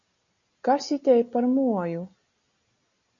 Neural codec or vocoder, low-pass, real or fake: none; 7.2 kHz; real